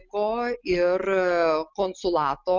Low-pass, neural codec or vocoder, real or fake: 7.2 kHz; none; real